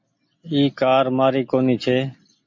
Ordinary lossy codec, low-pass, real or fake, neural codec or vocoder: MP3, 48 kbps; 7.2 kHz; real; none